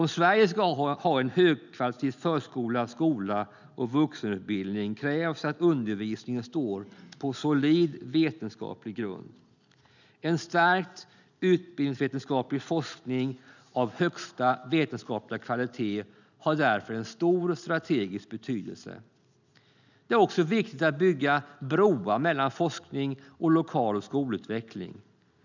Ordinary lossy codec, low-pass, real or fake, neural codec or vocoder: none; 7.2 kHz; real; none